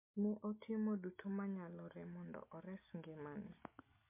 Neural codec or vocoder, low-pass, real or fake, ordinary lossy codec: none; 3.6 kHz; real; MP3, 32 kbps